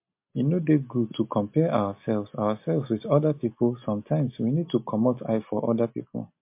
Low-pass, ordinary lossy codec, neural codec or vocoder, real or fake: 3.6 kHz; MP3, 32 kbps; none; real